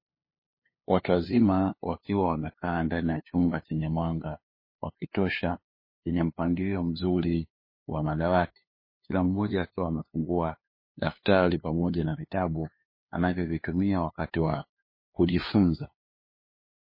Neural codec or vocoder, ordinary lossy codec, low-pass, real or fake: codec, 16 kHz, 2 kbps, FunCodec, trained on LibriTTS, 25 frames a second; MP3, 24 kbps; 5.4 kHz; fake